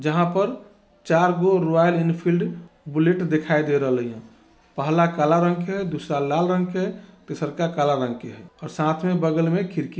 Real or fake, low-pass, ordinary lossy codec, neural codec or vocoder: real; none; none; none